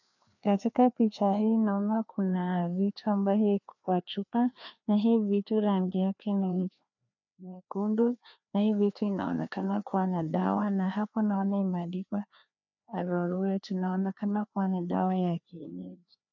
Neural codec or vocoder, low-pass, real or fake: codec, 16 kHz, 2 kbps, FreqCodec, larger model; 7.2 kHz; fake